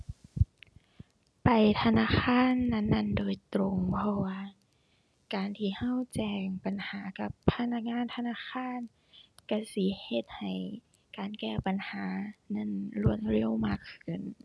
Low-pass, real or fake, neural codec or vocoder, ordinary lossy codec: none; real; none; none